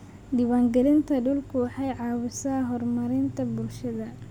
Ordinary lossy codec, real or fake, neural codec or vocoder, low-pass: none; real; none; 19.8 kHz